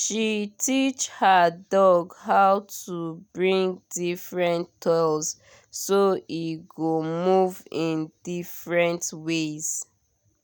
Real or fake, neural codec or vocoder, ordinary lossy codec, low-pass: real; none; none; none